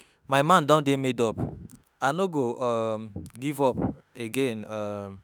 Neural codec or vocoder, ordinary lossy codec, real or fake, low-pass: autoencoder, 48 kHz, 32 numbers a frame, DAC-VAE, trained on Japanese speech; none; fake; none